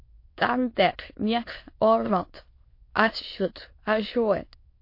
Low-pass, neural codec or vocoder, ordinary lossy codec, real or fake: 5.4 kHz; autoencoder, 22.05 kHz, a latent of 192 numbers a frame, VITS, trained on many speakers; MP3, 32 kbps; fake